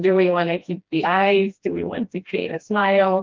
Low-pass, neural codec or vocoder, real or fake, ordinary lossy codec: 7.2 kHz; codec, 16 kHz, 1 kbps, FreqCodec, smaller model; fake; Opus, 24 kbps